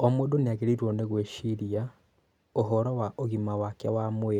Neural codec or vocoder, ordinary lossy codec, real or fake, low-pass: none; none; real; 19.8 kHz